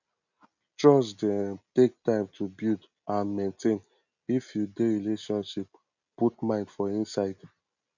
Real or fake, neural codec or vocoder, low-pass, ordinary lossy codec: real; none; 7.2 kHz; none